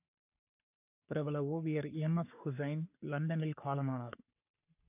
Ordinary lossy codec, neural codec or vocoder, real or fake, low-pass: AAC, 32 kbps; codec, 44.1 kHz, 3.4 kbps, Pupu-Codec; fake; 3.6 kHz